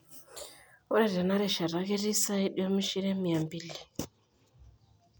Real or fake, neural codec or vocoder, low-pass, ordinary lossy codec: real; none; none; none